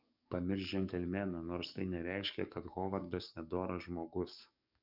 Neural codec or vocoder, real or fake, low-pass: codec, 44.1 kHz, 7.8 kbps, DAC; fake; 5.4 kHz